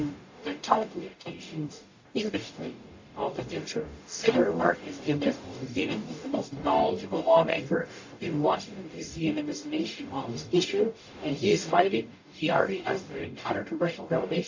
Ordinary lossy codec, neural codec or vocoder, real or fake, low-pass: AAC, 48 kbps; codec, 44.1 kHz, 0.9 kbps, DAC; fake; 7.2 kHz